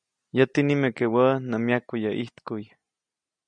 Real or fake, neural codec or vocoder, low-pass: real; none; 9.9 kHz